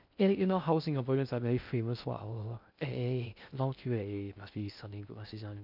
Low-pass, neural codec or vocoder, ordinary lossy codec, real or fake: 5.4 kHz; codec, 16 kHz in and 24 kHz out, 0.6 kbps, FocalCodec, streaming, 2048 codes; none; fake